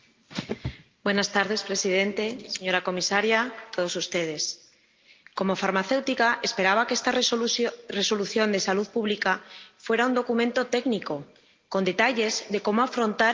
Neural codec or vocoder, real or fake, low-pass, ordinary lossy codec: none; real; 7.2 kHz; Opus, 32 kbps